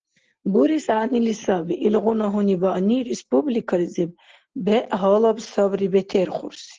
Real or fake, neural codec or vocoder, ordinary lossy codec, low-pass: real; none; Opus, 16 kbps; 7.2 kHz